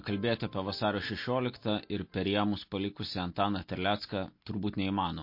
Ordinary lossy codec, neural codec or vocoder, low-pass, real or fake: MP3, 32 kbps; none; 5.4 kHz; real